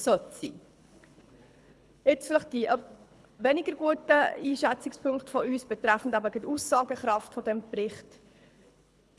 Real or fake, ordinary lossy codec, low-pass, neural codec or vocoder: fake; none; none; codec, 24 kHz, 6 kbps, HILCodec